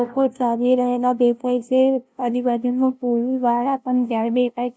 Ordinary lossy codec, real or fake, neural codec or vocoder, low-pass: none; fake; codec, 16 kHz, 0.5 kbps, FunCodec, trained on LibriTTS, 25 frames a second; none